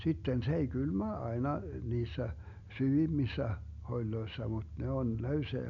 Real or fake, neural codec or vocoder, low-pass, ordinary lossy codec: real; none; 7.2 kHz; none